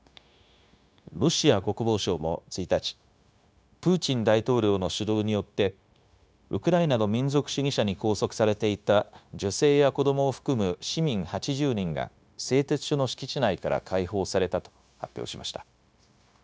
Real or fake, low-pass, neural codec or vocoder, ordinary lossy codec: fake; none; codec, 16 kHz, 0.9 kbps, LongCat-Audio-Codec; none